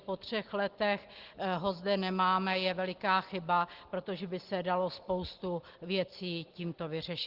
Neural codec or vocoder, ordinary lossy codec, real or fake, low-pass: none; Opus, 16 kbps; real; 5.4 kHz